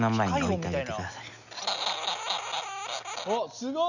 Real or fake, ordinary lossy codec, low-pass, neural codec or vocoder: real; none; 7.2 kHz; none